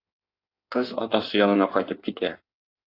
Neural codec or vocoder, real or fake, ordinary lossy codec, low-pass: codec, 16 kHz in and 24 kHz out, 1.1 kbps, FireRedTTS-2 codec; fake; AAC, 32 kbps; 5.4 kHz